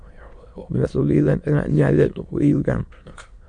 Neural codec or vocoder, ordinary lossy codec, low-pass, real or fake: autoencoder, 22.05 kHz, a latent of 192 numbers a frame, VITS, trained on many speakers; MP3, 64 kbps; 9.9 kHz; fake